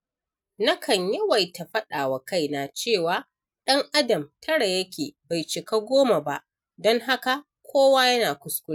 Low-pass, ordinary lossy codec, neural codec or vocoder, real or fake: 19.8 kHz; none; none; real